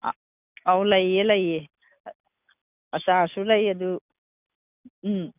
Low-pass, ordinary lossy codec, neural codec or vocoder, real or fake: 3.6 kHz; none; none; real